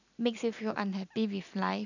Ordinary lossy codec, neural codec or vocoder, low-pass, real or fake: none; none; 7.2 kHz; real